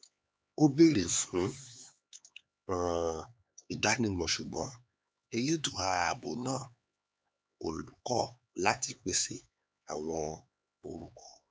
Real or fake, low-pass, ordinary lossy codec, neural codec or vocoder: fake; none; none; codec, 16 kHz, 4 kbps, X-Codec, HuBERT features, trained on LibriSpeech